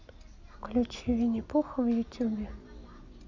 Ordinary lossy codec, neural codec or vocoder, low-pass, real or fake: none; none; 7.2 kHz; real